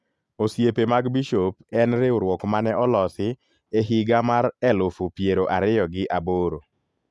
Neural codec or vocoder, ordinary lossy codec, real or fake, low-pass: none; none; real; none